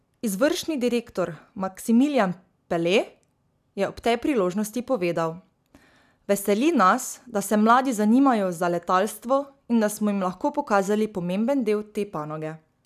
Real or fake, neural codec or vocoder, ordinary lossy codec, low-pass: real; none; none; 14.4 kHz